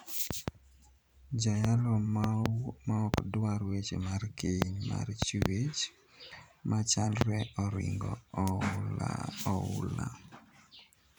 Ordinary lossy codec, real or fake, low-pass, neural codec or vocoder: none; fake; none; vocoder, 44.1 kHz, 128 mel bands every 512 samples, BigVGAN v2